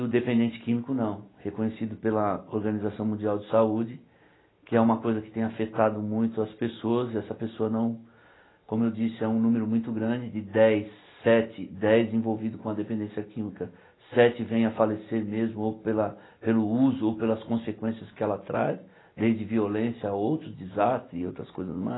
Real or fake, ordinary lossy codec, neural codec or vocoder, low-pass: real; AAC, 16 kbps; none; 7.2 kHz